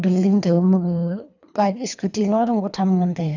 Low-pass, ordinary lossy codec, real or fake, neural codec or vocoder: 7.2 kHz; none; fake; codec, 24 kHz, 3 kbps, HILCodec